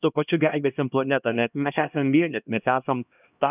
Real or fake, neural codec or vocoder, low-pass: fake; codec, 16 kHz, 1 kbps, X-Codec, HuBERT features, trained on LibriSpeech; 3.6 kHz